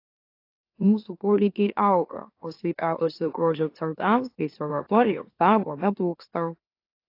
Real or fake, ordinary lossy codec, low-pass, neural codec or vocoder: fake; AAC, 32 kbps; 5.4 kHz; autoencoder, 44.1 kHz, a latent of 192 numbers a frame, MeloTTS